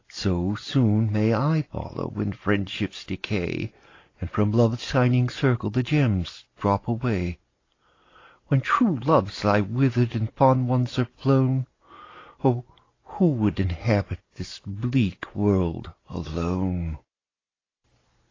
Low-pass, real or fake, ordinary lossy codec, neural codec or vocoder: 7.2 kHz; real; AAC, 32 kbps; none